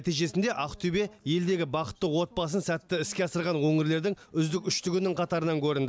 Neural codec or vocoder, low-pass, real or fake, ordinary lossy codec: none; none; real; none